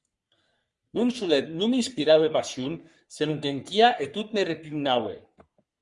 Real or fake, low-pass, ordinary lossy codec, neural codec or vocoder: fake; 10.8 kHz; Opus, 64 kbps; codec, 44.1 kHz, 3.4 kbps, Pupu-Codec